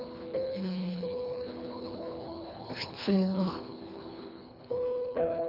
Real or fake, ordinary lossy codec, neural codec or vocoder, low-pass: fake; none; codec, 24 kHz, 3 kbps, HILCodec; 5.4 kHz